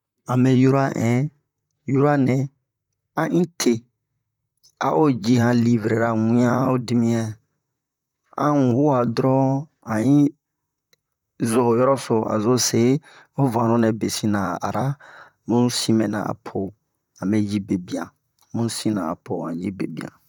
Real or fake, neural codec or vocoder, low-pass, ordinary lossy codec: fake; vocoder, 44.1 kHz, 128 mel bands, Pupu-Vocoder; 19.8 kHz; none